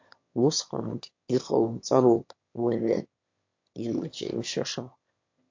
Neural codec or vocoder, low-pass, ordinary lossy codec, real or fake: autoencoder, 22.05 kHz, a latent of 192 numbers a frame, VITS, trained on one speaker; 7.2 kHz; MP3, 48 kbps; fake